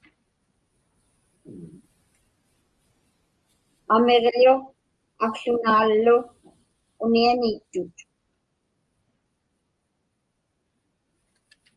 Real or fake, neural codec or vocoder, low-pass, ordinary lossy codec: real; none; 10.8 kHz; Opus, 32 kbps